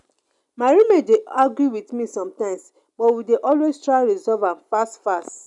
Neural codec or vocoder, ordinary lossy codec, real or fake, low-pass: none; none; real; 10.8 kHz